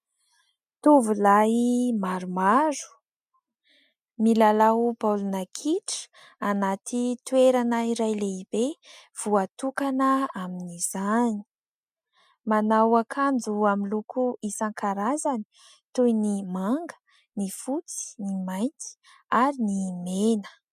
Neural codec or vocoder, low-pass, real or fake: none; 14.4 kHz; real